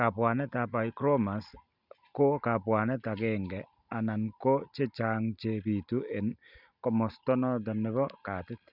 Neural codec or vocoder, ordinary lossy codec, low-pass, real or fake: none; none; 5.4 kHz; real